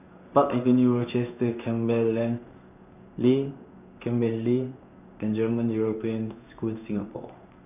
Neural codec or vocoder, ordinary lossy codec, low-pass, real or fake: codec, 16 kHz in and 24 kHz out, 1 kbps, XY-Tokenizer; none; 3.6 kHz; fake